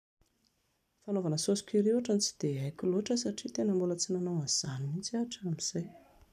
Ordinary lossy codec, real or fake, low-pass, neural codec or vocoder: none; real; 14.4 kHz; none